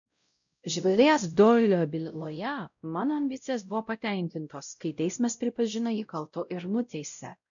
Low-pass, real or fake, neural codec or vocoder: 7.2 kHz; fake; codec, 16 kHz, 0.5 kbps, X-Codec, WavLM features, trained on Multilingual LibriSpeech